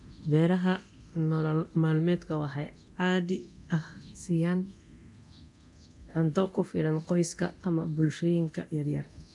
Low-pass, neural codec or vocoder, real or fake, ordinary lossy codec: 10.8 kHz; codec, 24 kHz, 0.9 kbps, DualCodec; fake; none